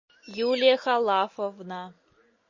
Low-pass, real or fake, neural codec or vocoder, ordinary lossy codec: 7.2 kHz; real; none; MP3, 32 kbps